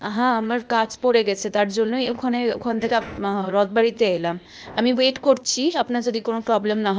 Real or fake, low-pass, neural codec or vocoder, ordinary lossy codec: fake; none; codec, 16 kHz, 0.8 kbps, ZipCodec; none